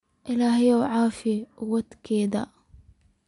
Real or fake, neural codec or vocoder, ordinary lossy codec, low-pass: real; none; MP3, 64 kbps; 10.8 kHz